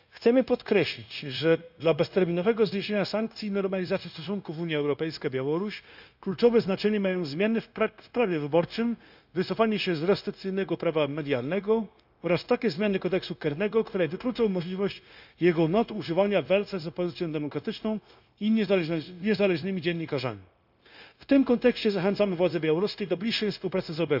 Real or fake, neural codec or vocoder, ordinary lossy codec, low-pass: fake; codec, 16 kHz, 0.9 kbps, LongCat-Audio-Codec; none; 5.4 kHz